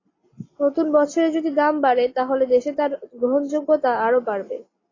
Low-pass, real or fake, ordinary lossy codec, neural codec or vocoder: 7.2 kHz; real; AAC, 32 kbps; none